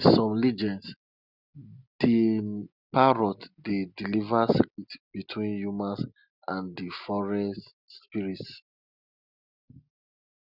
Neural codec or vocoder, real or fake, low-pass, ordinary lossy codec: none; real; 5.4 kHz; none